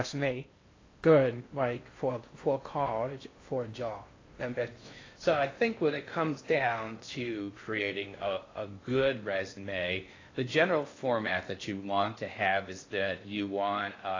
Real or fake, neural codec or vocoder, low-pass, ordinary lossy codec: fake; codec, 16 kHz in and 24 kHz out, 0.6 kbps, FocalCodec, streaming, 4096 codes; 7.2 kHz; AAC, 32 kbps